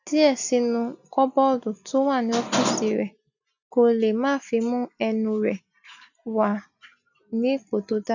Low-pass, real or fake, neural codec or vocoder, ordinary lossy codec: 7.2 kHz; real; none; none